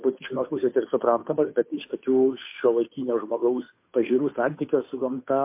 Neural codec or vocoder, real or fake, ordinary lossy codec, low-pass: codec, 16 kHz, 2 kbps, FunCodec, trained on Chinese and English, 25 frames a second; fake; MP3, 24 kbps; 3.6 kHz